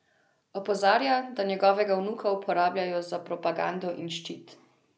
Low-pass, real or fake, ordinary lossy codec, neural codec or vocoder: none; real; none; none